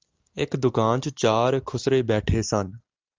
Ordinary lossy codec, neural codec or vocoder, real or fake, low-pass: Opus, 32 kbps; none; real; 7.2 kHz